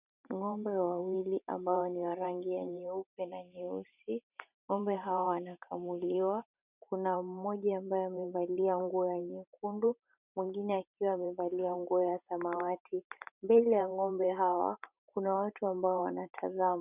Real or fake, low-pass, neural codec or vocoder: fake; 3.6 kHz; vocoder, 44.1 kHz, 128 mel bands every 512 samples, BigVGAN v2